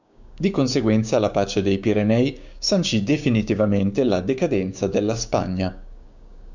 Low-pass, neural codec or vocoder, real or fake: 7.2 kHz; codec, 16 kHz, 6 kbps, DAC; fake